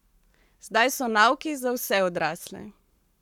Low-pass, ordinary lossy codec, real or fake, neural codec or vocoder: 19.8 kHz; none; fake; codec, 44.1 kHz, 7.8 kbps, Pupu-Codec